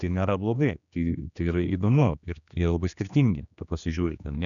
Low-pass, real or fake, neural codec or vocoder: 7.2 kHz; fake; codec, 16 kHz, 2 kbps, X-Codec, HuBERT features, trained on general audio